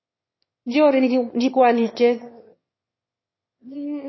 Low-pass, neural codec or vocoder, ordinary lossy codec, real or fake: 7.2 kHz; autoencoder, 22.05 kHz, a latent of 192 numbers a frame, VITS, trained on one speaker; MP3, 24 kbps; fake